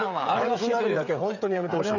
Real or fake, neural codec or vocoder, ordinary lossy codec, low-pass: fake; codec, 16 kHz, 16 kbps, FreqCodec, smaller model; none; 7.2 kHz